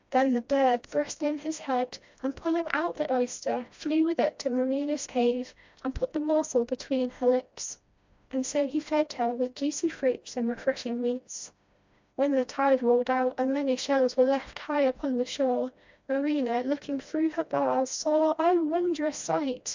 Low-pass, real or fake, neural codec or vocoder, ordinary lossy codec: 7.2 kHz; fake; codec, 16 kHz, 1 kbps, FreqCodec, smaller model; MP3, 64 kbps